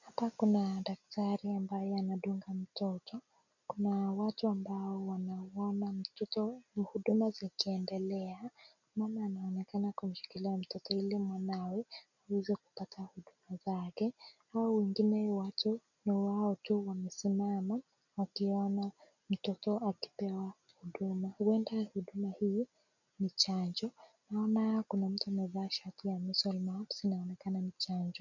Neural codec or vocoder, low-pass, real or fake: none; 7.2 kHz; real